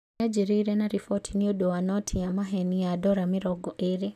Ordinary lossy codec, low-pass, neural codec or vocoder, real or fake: none; 14.4 kHz; none; real